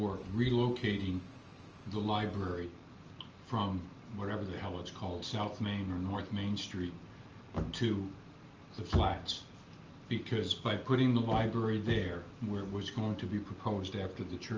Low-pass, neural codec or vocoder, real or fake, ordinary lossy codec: 7.2 kHz; none; real; Opus, 24 kbps